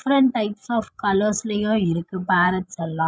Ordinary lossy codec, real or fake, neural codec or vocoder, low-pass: none; fake; codec, 16 kHz, 16 kbps, FreqCodec, larger model; none